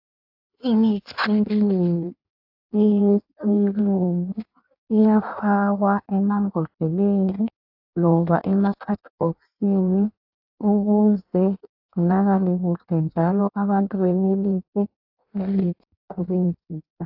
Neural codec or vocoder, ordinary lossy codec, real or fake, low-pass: codec, 16 kHz in and 24 kHz out, 1.1 kbps, FireRedTTS-2 codec; AAC, 48 kbps; fake; 5.4 kHz